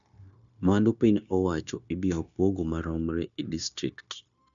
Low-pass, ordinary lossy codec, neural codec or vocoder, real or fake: 7.2 kHz; none; codec, 16 kHz, 0.9 kbps, LongCat-Audio-Codec; fake